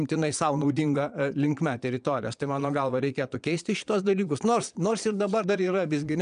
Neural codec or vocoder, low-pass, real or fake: vocoder, 22.05 kHz, 80 mel bands, Vocos; 9.9 kHz; fake